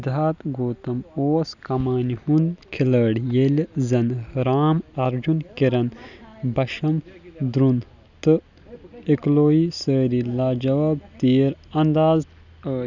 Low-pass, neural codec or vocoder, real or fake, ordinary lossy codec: 7.2 kHz; none; real; none